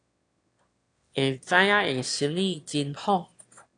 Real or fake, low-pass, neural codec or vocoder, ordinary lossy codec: fake; 9.9 kHz; autoencoder, 22.05 kHz, a latent of 192 numbers a frame, VITS, trained on one speaker; AAC, 48 kbps